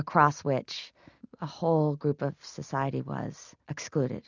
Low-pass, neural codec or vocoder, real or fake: 7.2 kHz; none; real